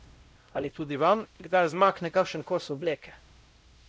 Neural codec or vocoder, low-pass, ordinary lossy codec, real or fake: codec, 16 kHz, 0.5 kbps, X-Codec, WavLM features, trained on Multilingual LibriSpeech; none; none; fake